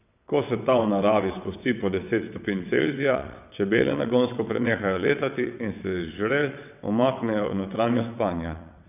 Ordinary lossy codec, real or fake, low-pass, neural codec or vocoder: none; fake; 3.6 kHz; vocoder, 22.05 kHz, 80 mel bands, WaveNeXt